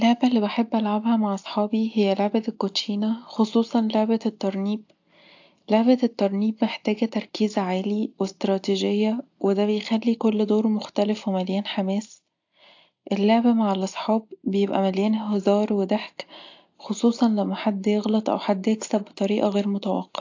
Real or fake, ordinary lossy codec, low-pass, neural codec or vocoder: real; AAC, 48 kbps; 7.2 kHz; none